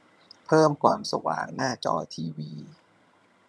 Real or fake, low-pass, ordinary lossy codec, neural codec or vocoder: fake; none; none; vocoder, 22.05 kHz, 80 mel bands, HiFi-GAN